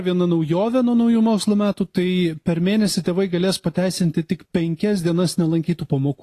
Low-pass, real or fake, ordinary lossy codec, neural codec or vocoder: 14.4 kHz; real; AAC, 48 kbps; none